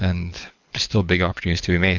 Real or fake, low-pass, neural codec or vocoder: fake; 7.2 kHz; codec, 24 kHz, 6 kbps, HILCodec